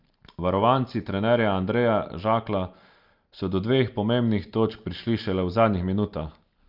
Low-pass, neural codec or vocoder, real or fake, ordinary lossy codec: 5.4 kHz; none; real; Opus, 24 kbps